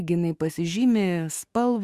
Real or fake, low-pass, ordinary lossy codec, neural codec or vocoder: fake; 14.4 kHz; Opus, 64 kbps; autoencoder, 48 kHz, 128 numbers a frame, DAC-VAE, trained on Japanese speech